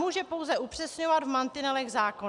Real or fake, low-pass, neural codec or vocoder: real; 10.8 kHz; none